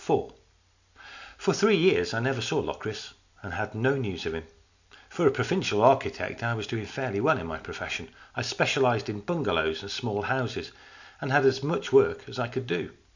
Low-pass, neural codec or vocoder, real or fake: 7.2 kHz; none; real